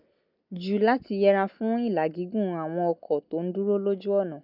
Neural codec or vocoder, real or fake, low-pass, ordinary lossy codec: none; real; 5.4 kHz; none